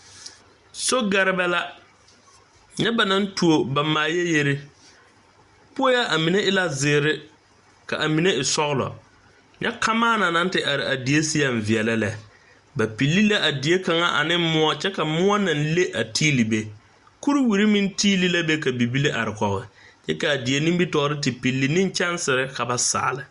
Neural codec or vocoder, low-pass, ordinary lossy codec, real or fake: none; 10.8 kHz; Opus, 64 kbps; real